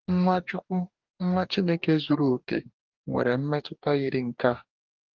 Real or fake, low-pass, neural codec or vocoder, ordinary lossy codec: fake; 7.2 kHz; codec, 44.1 kHz, 2.6 kbps, DAC; Opus, 24 kbps